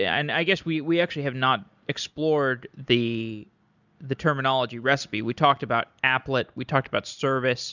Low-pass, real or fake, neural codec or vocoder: 7.2 kHz; real; none